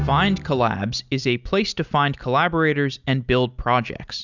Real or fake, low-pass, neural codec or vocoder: real; 7.2 kHz; none